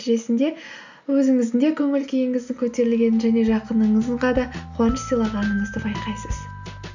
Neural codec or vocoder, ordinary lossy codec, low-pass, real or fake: none; none; 7.2 kHz; real